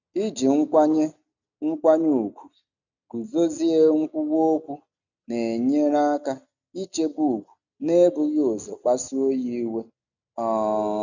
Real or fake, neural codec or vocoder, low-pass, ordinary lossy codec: real; none; 7.2 kHz; none